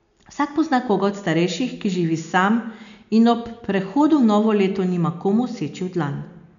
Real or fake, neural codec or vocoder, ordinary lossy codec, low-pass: real; none; none; 7.2 kHz